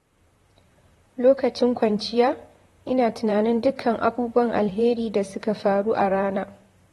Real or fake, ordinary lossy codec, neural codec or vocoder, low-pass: fake; AAC, 32 kbps; vocoder, 44.1 kHz, 128 mel bands, Pupu-Vocoder; 19.8 kHz